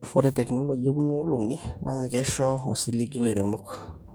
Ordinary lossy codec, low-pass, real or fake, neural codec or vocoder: none; none; fake; codec, 44.1 kHz, 2.6 kbps, DAC